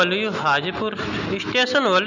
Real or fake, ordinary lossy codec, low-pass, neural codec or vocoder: real; none; 7.2 kHz; none